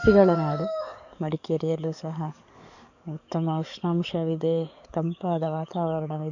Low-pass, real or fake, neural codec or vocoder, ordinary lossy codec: 7.2 kHz; fake; codec, 44.1 kHz, 7.8 kbps, DAC; none